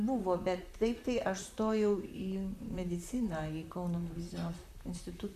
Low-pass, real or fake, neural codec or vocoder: 14.4 kHz; fake; vocoder, 44.1 kHz, 128 mel bands, Pupu-Vocoder